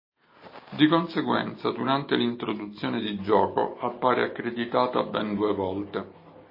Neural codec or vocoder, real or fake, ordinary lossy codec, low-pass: none; real; MP3, 24 kbps; 5.4 kHz